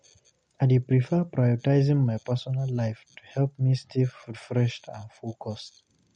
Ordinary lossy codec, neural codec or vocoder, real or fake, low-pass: MP3, 48 kbps; none; real; 9.9 kHz